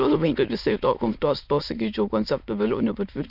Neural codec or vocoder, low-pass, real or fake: autoencoder, 22.05 kHz, a latent of 192 numbers a frame, VITS, trained on many speakers; 5.4 kHz; fake